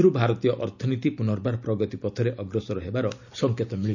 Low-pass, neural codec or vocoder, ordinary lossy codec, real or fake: 7.2 kHz; none; none; real